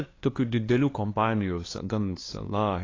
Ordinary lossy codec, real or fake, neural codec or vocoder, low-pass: AAC, 32 kbps; fake; codec, 16 kHz, 2 kbps, FunCodec, trained on LibriTTS, 25 frames a second; 7.2 kHz